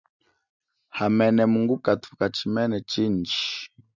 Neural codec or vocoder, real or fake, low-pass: none; real; 7.2 kHz